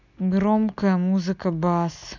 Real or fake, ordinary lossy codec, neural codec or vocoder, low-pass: real; none; none; 7.2 kHz